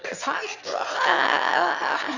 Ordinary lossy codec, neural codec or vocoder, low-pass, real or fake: none; autoencoder, 22.05 kHz, a latent of 192 numbers a frame, VITS, trained on one speaker; 7.2 kHz; fake